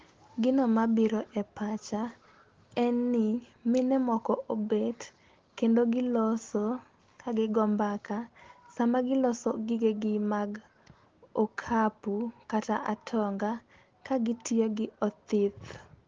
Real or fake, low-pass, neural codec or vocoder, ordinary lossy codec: real; 7.2 kHz; none; Opus, 16 kbps